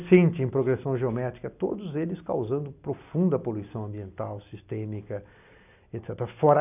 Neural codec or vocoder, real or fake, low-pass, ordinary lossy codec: none; real; 3.6 kHz; none